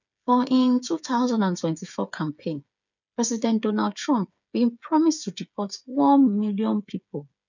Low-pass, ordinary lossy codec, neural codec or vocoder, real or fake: 7.2 kHz; none; codec, 16 kHz, 8 kbps, FreqCodec, smaller model; fake